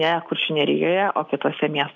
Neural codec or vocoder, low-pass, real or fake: none; 7.2 kHz; real